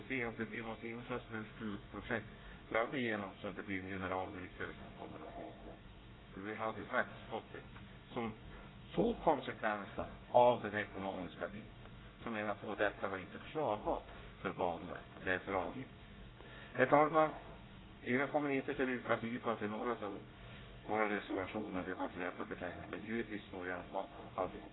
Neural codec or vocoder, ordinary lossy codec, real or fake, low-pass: codec, 24 kHz, 1 kbps, SNAC; AAC, 16 kbps; fake; 7.2 kHz